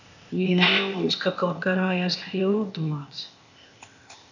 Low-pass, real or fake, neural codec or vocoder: 7.2 kHz; fake; codec, 16 kHz, 0.8 kbps, ZipCodec